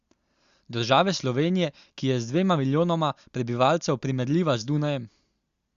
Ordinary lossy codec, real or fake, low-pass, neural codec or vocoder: Opus, 64 kbps; real; 7.2 kHz; none